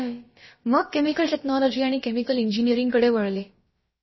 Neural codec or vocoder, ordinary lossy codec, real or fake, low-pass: codec, 16 kHz, about 1 kbps, DyCAST, with the encoder's durations; MP3, 24 kbps; fake; 7.2 kHz